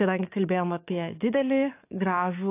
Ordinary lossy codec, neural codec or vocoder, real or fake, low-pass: AAC, 24 kbps; codec, 44.1 kHz, 7.8 kbps, DAC; fake; 3.6 kHz